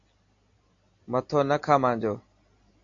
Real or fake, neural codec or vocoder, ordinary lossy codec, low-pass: real; none; AAC, 48 kbps; 7.2 kHz